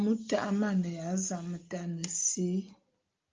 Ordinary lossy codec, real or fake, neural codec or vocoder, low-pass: Opus, 24 kbps; real; none; 7.2 kHz